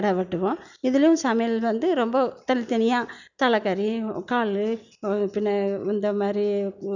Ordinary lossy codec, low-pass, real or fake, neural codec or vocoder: none; 7.2 kHz; real; none